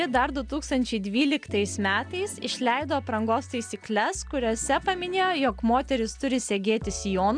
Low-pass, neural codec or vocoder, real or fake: 9.9 kHz; none; real